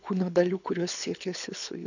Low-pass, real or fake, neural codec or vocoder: 7.2 kHz; fake; codec, 24 kHz, 6 kbps, HILCodec